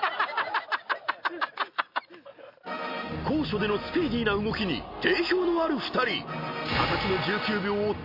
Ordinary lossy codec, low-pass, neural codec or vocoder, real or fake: MP3, 32 kbps; 5.4 kHz; none; real